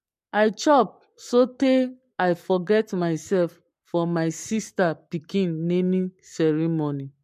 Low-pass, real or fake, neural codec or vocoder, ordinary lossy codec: 14.4 kHz; fake; codec, 44.1 kHz, 7.8 kbps, Pupu-Codec; MP3, 64 kbps